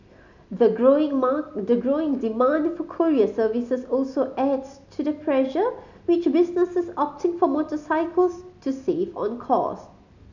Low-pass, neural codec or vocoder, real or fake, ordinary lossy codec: 7.2 kHz; none; real; none